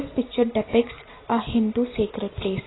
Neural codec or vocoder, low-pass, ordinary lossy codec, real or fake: none; 7.2 kHz; AAC, 16 kbps; real